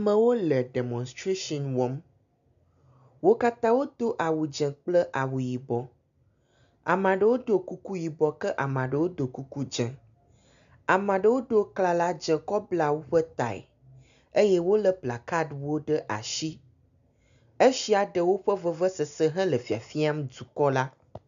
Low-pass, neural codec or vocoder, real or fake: 7.2 kHz; none; real